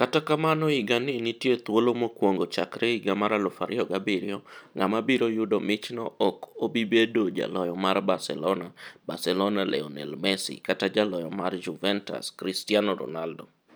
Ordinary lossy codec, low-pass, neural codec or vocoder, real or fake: none; none; none; real